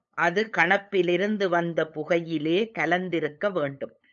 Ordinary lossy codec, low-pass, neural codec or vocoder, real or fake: MP3, 96 kbps; 7.2 kHz; codec, 16 kHz, 8 kbps, FunCodec, trained on LibriTTS, 25 frames a second; fake